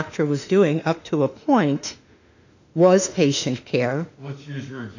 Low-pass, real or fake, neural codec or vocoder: 7.2 kHz; fake; autoencoder, 48 kHz, 32 numbers a frame, DAC-VAE, trained on Japanese speech